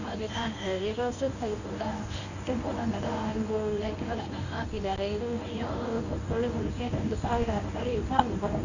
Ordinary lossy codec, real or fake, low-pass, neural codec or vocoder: none; fake; 7.2 kHz; codec, 24 kHz, 0.9 kbps, WavTokenizer, medium speech release version 1